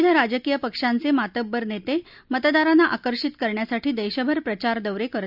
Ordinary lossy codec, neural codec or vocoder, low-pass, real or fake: none; none; 5.4 kHz; real